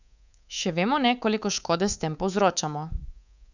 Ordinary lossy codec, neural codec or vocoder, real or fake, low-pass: none; codec, 24 kHz, 3.1 kbps, DualCodec; fake; 7.2 kHz